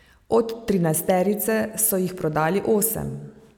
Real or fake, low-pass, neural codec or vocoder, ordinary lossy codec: real; none; none; none